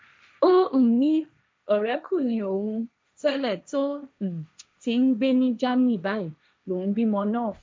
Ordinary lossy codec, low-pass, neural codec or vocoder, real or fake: none; none; codec, 16 kHz, 1.1 kbps, Voila-Tokenizer; fake